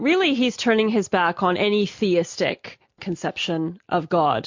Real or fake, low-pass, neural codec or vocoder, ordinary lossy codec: real; 7.2 kHz; none; MP3, 48 kbps